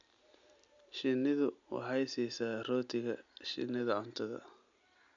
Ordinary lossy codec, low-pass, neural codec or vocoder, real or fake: none; 7.2 kHz; none; real